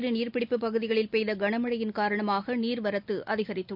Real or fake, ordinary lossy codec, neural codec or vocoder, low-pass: real; none; none; 5.4 kHz